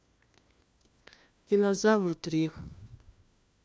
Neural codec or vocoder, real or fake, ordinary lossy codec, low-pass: codec, 16 kHz, 1 kbps, FunCodec, trained on LibriTTS, 50 frames a second; fake; none; none